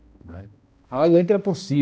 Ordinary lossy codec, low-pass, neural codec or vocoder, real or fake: none; none; codec, 16 kHz, 1 kbps, X-Codec, HuBERT features, trained on balanced general audio; fake